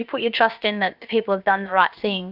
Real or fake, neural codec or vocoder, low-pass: fake; codec, 16 kHz, about 1 kbps, DyCAST, with the encoder's durations; 5.4 kHz